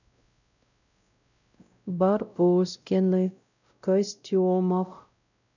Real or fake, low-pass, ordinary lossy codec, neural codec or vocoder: fake; 7.2 kHz; none; codec, 16 kHz, 0.5 kbps, X-Codec, WavLM features, trained on Multilingual LibriSpeech